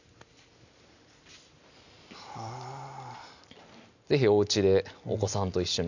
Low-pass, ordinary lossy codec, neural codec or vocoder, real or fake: 7.2 kHz; none; none; real